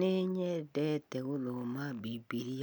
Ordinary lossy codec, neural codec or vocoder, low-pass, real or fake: none; none; none; real